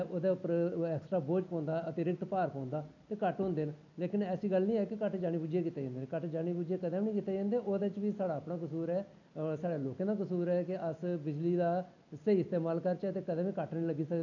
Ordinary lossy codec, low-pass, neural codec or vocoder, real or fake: none; 7.2 kHz; none; real